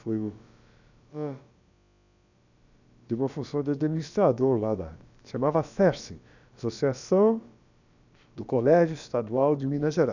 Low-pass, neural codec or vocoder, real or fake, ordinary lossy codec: 7.2 kHz; codec, 16 kHz, about 1 kbps, DyCAST, with the encoder's durations; fake; none